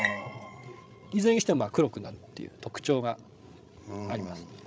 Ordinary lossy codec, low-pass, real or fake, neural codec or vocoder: none; none; fake; codec, 16 kHz, 16 kbps, FreqCodec, larger model